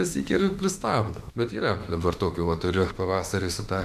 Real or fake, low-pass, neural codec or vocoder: fake; 14.4 kHz; autoencoder, 48 kHz, 32 numbers a frame, DAC-VAE, trained on Japanese speech